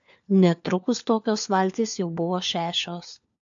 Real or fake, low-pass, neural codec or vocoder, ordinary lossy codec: fake; 7.2 kHz; codec, 16 kHz, 4 kbps, FunCodec, trained on LibriTTS, 50 frames a second; AAC, 64 kbps